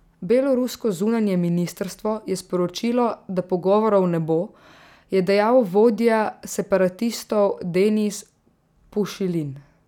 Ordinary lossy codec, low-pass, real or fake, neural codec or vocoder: none; 19.8 kHz; real; none